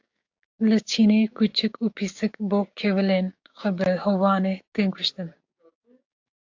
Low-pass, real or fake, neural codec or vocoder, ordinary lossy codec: 7.2 kHz; fake; codec, 16 kHz, 6 kbps, DAC; AAC, 48 kbps